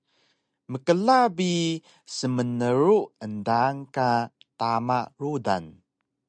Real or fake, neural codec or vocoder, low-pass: real; none; 9.9 kHz